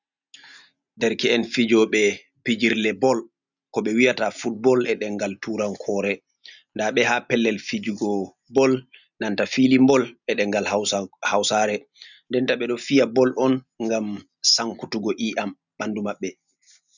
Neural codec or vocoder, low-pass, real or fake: none; 7.2 kHz; real